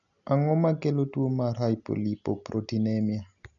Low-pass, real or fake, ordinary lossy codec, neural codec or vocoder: 7.2 kHz; real; none; none